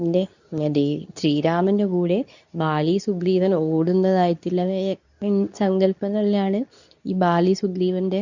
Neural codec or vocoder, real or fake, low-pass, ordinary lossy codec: codec, 24 kHz, 0.9 kbps, WavTokenizer, medium speech release version 2; fake; 7.2 kHz; none